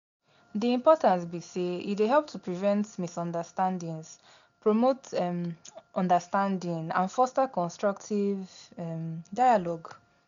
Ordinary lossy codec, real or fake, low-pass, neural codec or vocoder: none; real; 7.2 kHz; none